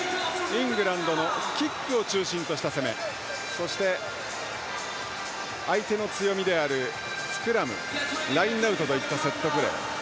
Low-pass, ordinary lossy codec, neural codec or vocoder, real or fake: none; none; none; real